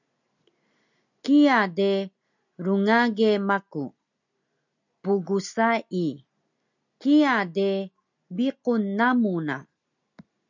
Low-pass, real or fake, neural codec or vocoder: 7.2 kHz; real; none